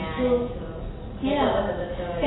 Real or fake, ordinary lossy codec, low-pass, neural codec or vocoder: real; AAC, 16 kbps; 7.2 kHz; none